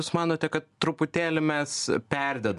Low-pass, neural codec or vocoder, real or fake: 10.8 kHz; none; real